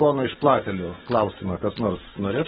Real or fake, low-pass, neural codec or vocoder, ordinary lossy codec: real; 10.8 kHz; none; AAC, 16 kbps